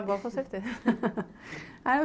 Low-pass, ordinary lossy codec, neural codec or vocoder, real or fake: none; none; none; real